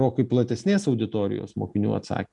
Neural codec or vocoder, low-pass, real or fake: none; 10.8 kHz; real